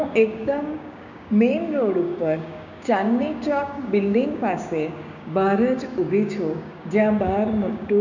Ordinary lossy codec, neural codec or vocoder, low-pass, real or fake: none; codec, 16 kHz, 6 kbps, DAC; 7.2 kHz; fake